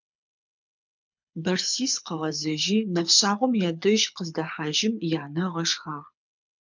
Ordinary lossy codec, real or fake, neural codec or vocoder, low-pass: MP3, 64 kbps; fake; codec, 24 kHz, 6 kbps, HILCodec; 7.2 kHz